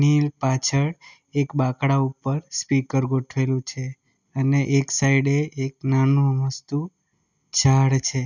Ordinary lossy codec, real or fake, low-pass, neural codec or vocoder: none; real; 7.2 kHz; none